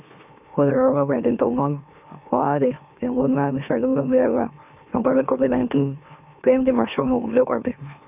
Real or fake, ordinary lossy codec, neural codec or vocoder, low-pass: fake; none; autoencoder, 44.1 kHz, a latent of 192 numbers a frame, MeloTTS; 3.6 kHz